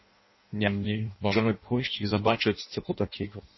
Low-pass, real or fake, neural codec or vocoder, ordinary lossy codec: 7.2 kHz; fake; codec, 16 kHz in and 24 kHz out, 0.6 kbps, FireRedTTS-2 codec; MP3, 24 kbps